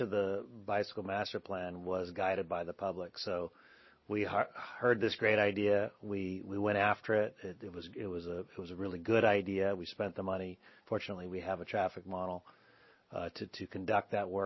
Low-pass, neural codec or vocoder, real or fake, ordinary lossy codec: 7.2 kHz; none; real; MP3, 24 kbps